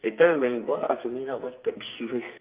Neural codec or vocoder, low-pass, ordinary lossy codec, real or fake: codec, 44.1 kHz, 2.6 kbps, SNAC; 3.6 kHz; Opus, 24 kbps; fake